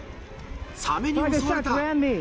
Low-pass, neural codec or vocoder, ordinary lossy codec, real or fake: none; none; none; real